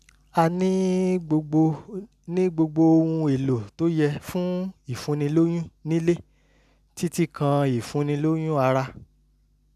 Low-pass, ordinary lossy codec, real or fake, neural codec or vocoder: 14.4 kHz; none; real; none